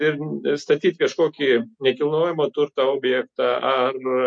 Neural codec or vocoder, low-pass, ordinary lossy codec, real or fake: none; 9.9 kHz; MP3, 48 kbps; real